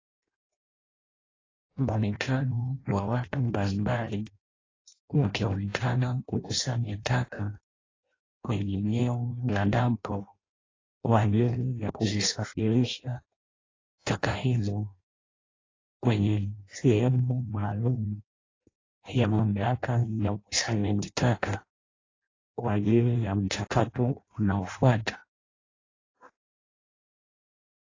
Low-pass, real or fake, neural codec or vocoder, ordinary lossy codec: 7.2 kHz; fake; codec, 16 kHz in and 24 kHz out, 0.6 kbps, FireRedTTS-2 codec; AAC, 32 kbps